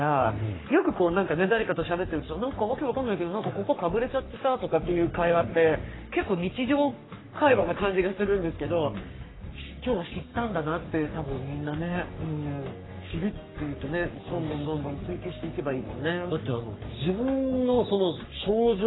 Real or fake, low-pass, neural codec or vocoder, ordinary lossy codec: fake; 7.2 kHz; codec, 44.1 kHz, 3.4 kbps, Pupu-Codec; AAC, 16 kbps